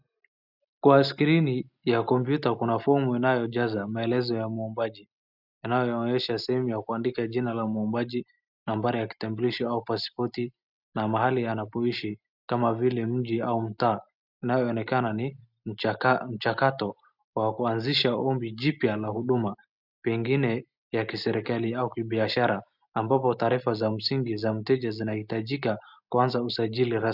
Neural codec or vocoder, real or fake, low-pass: none; real; 5.4 kHz